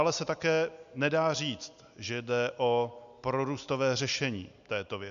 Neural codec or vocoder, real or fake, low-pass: none; real; 7.2 kHz